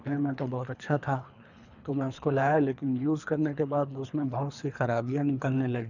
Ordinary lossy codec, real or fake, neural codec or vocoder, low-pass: none; fake; codec, 24 kHz, 3 kbps, HILCodec; 7.2 kHz